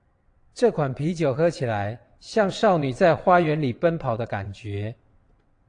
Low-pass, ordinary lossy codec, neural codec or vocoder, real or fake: 9.9 kHz; AAC, 48 kbps; vocoder, 22.05 kHz, 80 mel bands, WaveNeXt; fake